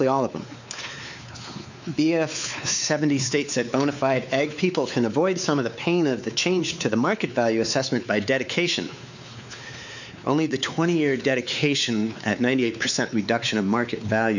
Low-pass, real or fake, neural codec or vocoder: 7.2 kHz; fake; codec, 16 kHz, 4 kbps, X-Codec, WavLM features, trained on Multilingual LibriSpeech